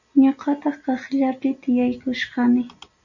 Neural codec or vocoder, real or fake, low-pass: none; real; 7.2 kHz